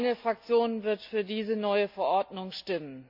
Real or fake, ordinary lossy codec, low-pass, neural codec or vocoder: real; MP3, 48 kbps; 5.4 kHz; none